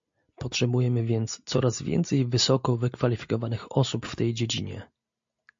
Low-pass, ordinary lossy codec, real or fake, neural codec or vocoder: 7.2 kHz; MP3, 48 kbps; real; none